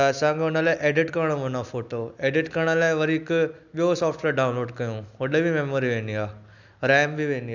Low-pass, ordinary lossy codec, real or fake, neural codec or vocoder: 7.2 kHz; none; real; none